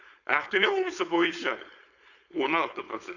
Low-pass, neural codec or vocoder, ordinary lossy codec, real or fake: 7.2 kHz; codec, 16 kHz, 4.8 kbps, FACodec; none; fake